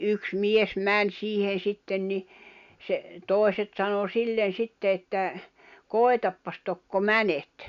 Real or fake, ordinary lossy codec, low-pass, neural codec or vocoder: real; none; 7.2 kHz; none